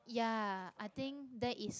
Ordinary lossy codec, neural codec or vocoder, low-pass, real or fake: none; none; none; real